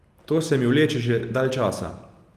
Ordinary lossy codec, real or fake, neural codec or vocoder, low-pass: Opus, 32 kbps; real; none; 14.4 kHz